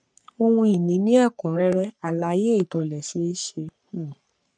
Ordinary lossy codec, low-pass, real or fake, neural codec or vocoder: none; 9.9 kHz; fake; codec, 44.1 kHz, 3.4 kbps, Pupu-Codec